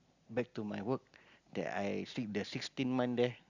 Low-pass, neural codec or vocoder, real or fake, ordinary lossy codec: 7.2 kHz; none; real; Opus, 64 kbps